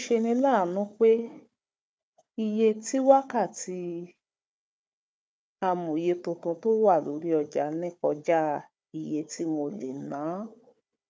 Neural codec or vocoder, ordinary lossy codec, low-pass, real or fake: codec, 16 kHz, 4 kbps, FunCodec, trained on Chinese and English, 50 frames a second; none; none; fake